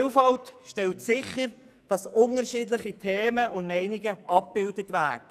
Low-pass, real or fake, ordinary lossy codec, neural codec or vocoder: 14.4 kHz; fake; none; codec, 44.1 kHz, 2.6 kbps, SNAC